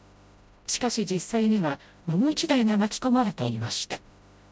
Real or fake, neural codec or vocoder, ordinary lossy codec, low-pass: fake; codec, 16 kHz, 0.5 kbps, FreqCodec, smaller model; none; none